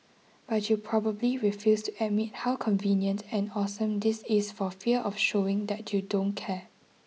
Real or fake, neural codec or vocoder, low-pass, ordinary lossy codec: real; none; none; none